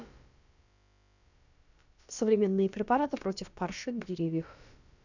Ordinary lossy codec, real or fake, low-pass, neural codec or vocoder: none; fake; 7.2 kHz; codec, 16 kHz, about 1 kbps, DyCAST, with the encoder's durations